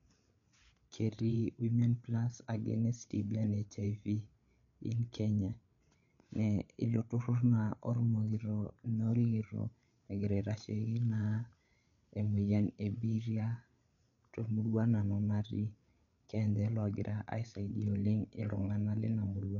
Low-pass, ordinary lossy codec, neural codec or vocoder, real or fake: 7.2 kHz; none; codec, 16 kHz, 8 kbps, FreqCodec, larger model; fake